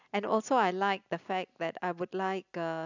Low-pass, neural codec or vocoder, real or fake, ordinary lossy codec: 7.2 kHz; none; real; none